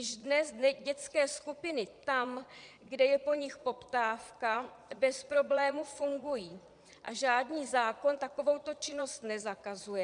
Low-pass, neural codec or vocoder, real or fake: 9.9 kHz; vocoder, 22.05 kHz, 80 mel bands, Vocos; fake